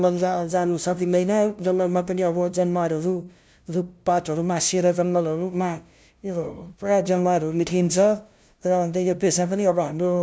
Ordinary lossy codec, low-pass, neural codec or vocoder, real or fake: none; none; codec, 16 kHz, 0.5 kbps, FunCodec, trained on LibriTTS, 25 frames a second; fake